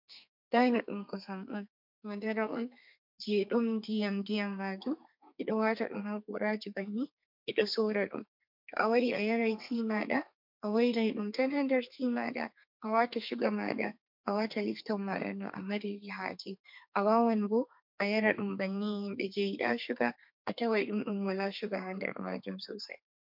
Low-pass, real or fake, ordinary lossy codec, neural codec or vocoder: 5.4 kHz; fake; MP3, 48 kbps; codec, 32 kHz, 1.9 kbps, SNAC